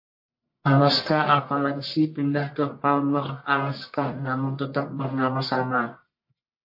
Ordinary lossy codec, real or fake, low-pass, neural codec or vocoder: MP3, 32 kbps; fake; 5.4 kHz; codec, 44.1 kHz, 1.7 kbps, Pupu-Codec